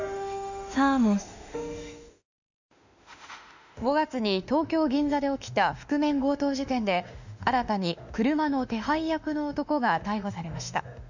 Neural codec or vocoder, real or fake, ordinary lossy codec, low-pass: autoencoder, 48 kHz, 32 numbers a frame, DAC-VAE, trained on Japanese speech; fake; none; 7.2 kHz